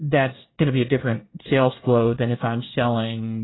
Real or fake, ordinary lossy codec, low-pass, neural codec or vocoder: fake; AAC, 16 kbps; 7.2 kHz; codec, 24 kHz, 1 kbps, SNAC